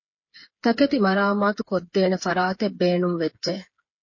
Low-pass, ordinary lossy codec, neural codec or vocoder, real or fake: 7.2 kHz; MP3, 32 kbps; codec, 16 kHz, 8 kbps, FreqCodec, smaller model; fake